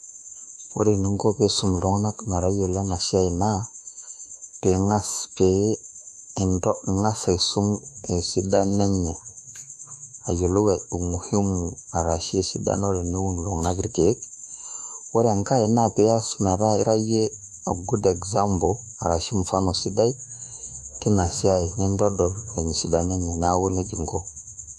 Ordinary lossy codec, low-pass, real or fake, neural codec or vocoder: none; 14.4 kHz; fake; autoencoder, 48 kHz, 32 numbers a frame, DAC-VAE, trained on Japanese speech